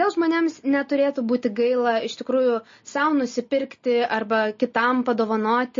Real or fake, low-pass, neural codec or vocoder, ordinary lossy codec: real; 7.2 kHz; none; MP3, 32 kbps